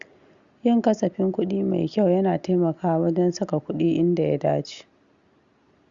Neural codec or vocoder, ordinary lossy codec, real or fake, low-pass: none; Opus, 64 kbps; real; 7.2 kHz